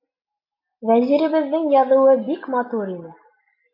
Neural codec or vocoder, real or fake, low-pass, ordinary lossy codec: none; real; 5.4 kHz; AAC, 48 kbps